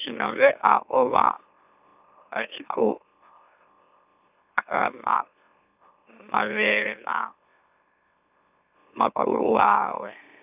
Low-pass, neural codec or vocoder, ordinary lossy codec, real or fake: 3.6 kHz; autoencoder, 44.1 kHz, a latent of 192 numbers a frame, MeloTTS; none; fake